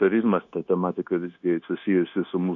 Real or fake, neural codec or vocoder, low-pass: fake; codec, 16 kHz, 0.9 kbps, LongCat-Audio-Codec; 7.2 kHz